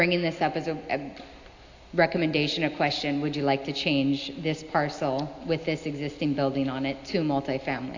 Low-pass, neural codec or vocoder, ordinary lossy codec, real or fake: 7.2 kHz; none; AAC, 48 kbps; real